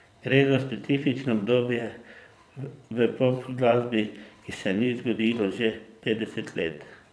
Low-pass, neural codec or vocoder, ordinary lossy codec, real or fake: none; vocoder, 22.05 kHz, 80 mel bands, WaveNeXt; none; fake